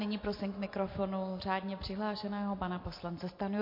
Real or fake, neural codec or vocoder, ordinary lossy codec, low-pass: real; none; MP3, 32 kbps; 5.4 kHz